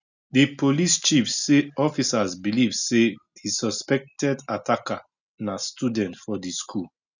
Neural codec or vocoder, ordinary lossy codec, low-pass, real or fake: none; none; 7.2 kHz; real